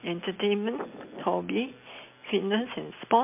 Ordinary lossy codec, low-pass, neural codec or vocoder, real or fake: MP3, 24 kbps; 3.6 kHz; none; real